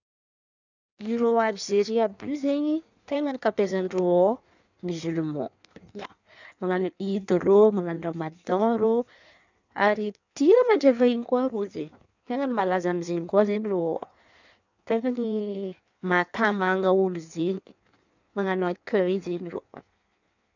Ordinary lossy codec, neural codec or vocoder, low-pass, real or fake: none; codec, 16 kHz in and 24 kHz out, 1.1 kbps, FireRedTTS-2 codec; 7.2 kHz; fake